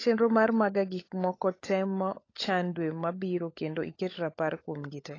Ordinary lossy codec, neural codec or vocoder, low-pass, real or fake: AAC, 32 kbps; codec, 16 kHz, 16 kbps, FunCodec, trained on LibriTTS, 50 frames a second; 7.2 kHz; fake